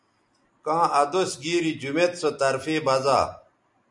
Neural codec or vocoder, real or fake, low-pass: none; real; 10.8 kHz